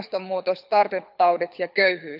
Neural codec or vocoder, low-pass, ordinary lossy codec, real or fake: codec, 24 kHz, 6 kbps, HILCodec; 5.4 kHz; none; fake